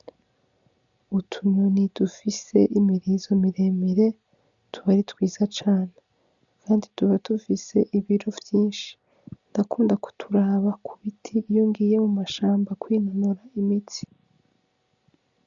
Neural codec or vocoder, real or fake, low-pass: none; real; 7.2 kHz